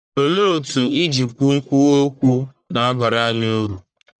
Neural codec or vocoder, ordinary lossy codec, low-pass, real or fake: codec, 44.1 kHz, 1.7 kbps, Pupu-Codec; none; 9.9 kHz; fake